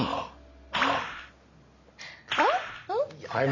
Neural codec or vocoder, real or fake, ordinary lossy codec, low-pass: none; real; none; 7.2 kHz